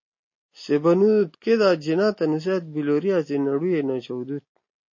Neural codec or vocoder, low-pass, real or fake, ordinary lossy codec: none; 7.2 kHz; real; MP3, 32 kbps